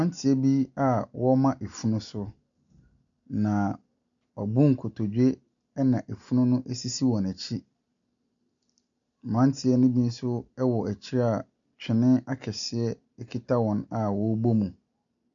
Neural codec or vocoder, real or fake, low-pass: none; real; 7.2 kHz